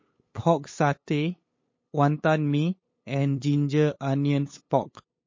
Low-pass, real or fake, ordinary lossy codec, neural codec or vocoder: 7.2 kHz; fake; MP3, 32 kbps; codec, 16 kHz, 8 kbps, FunCodec, trained on LibriTTS, 25 frames a second